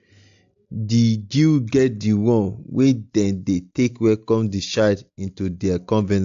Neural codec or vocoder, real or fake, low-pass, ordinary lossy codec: none; real; 7.2 kHz; AAC, 64 kbps